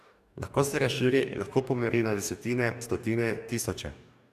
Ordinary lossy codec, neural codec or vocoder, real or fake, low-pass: none; codec, 44.1 kHz, 2.6 kbps, DAC; fake; 14.4 kHz